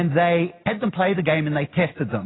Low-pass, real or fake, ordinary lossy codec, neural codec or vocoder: 7.2 kHz; real; AAC, 16 kbps; none